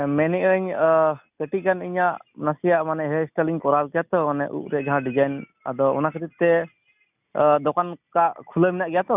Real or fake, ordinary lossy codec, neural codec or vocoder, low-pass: real; none; none; 3.6 kHz